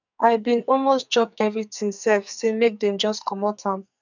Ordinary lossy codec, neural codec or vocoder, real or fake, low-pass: none; codec, 44.1 kHz, 2.6 kbps, SNAC; fake; 7.2 kHz